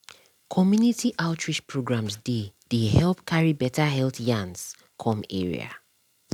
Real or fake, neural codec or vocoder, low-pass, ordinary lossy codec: real; none; 19.8 kHz; none